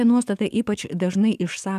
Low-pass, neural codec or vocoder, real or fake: 14.4 kHz; codec, 44.1 kHz, 7.8 kbps, DAC; fake